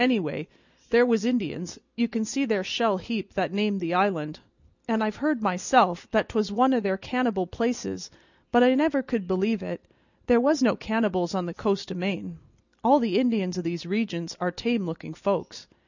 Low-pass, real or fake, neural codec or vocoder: 7.2 kHz; real; none